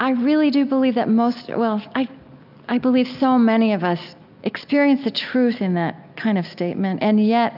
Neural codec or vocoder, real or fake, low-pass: none; real; 5.4 kHz